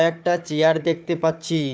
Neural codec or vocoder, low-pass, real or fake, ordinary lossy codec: codec, 16 kHz, 4 kbps, FunCodec, trained on Chinese and English, 50 frames a second; none; fake; none